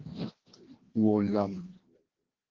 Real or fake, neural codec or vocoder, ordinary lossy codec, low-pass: fake; codec, 16 kHz, 1 kbps, FreqCodec, larger model; Opus, 16 kbps; 7.2 kHz